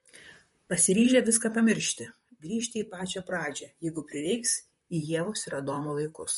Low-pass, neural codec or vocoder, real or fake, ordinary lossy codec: 19.8 kHz; vocoder, 44.1 kHz, 128 mel bands, Pupu-Vocoder; fake; MP3, 48 kbps